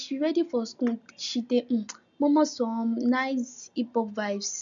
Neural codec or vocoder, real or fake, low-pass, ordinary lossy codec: none; real; 7.2 kHz; none